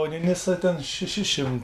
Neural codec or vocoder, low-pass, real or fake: none; 14.4 kHz; real